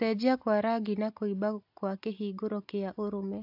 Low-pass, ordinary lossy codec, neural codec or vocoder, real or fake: 5.4 kHz; AAC, 48 kbps; none; real